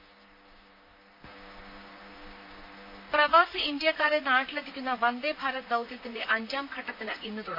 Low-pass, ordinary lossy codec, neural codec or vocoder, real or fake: 5.4 kHz; none; vocoder, 44.1 kHz, 128 mel bands, Pupu-Vocoder; fake